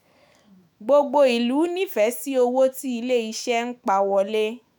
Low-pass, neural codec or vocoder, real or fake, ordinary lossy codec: none; autoencoder, 48 kHz, 128 numbers a frame, DAC-VAE, trained on Japanese speech; fake; none